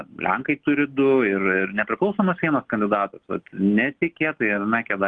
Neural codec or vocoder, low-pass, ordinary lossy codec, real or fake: none; 9.9 kHz; Opus, 24 kbps; real